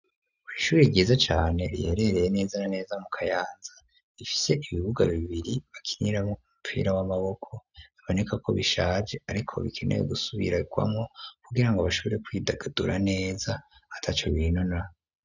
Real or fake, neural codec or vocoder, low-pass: real; none; 7.2 kHz